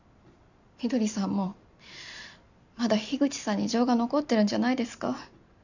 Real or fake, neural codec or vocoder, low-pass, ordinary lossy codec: real; none; 7.2 kHz; none